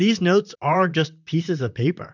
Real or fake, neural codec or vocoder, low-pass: fake; vocoder, 44.1 kHz, 128 mel bands, Pupu-Vocoder; 7.2 kHz